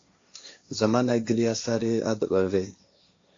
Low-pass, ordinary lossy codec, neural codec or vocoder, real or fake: 7.2 kHz; AAC, 48 kbps; codec, 16 kHz, 1.1 kbps, Voila-Tokenizer; fake